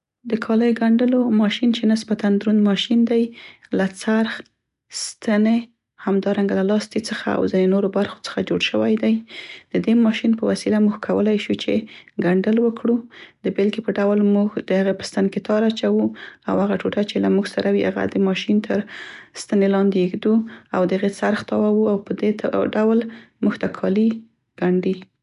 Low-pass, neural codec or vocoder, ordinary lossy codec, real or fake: 10.8 kHz; none; none; real